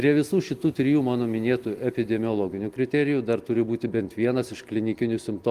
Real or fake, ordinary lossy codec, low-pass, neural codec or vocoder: real; Opus, 24 kbps; 14.4 kHz; none